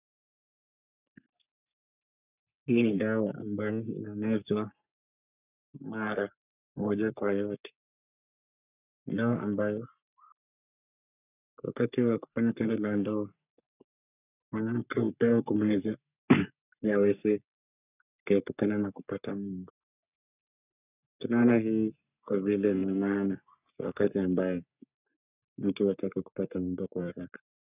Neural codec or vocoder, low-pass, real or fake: codec, 44.1 kHz, 3.4 kbps, Pupu-Codec; 3.6 kHz; fake